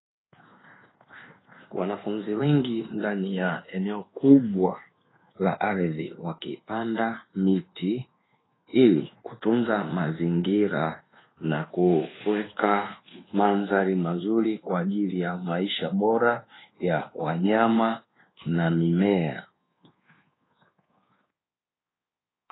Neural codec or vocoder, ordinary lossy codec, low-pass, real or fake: codec, 24 kHz, 1.2 kbps, DualCodec; AAC, 16 kbps; 7.2 kHz; fake